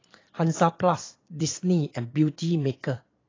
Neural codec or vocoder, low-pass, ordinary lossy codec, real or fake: vocoder, 22.05 kHz, 80 mel bands, Vocos; 7.2 kHz; AAC, 32 kbps; fake